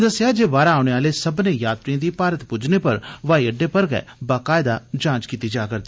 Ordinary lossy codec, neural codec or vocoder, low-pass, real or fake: none; none; none; real